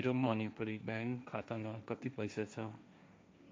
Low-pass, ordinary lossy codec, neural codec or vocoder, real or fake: none; none; codec, 16 kHz, 1.1 kbps, Voila-Tokenizer; fake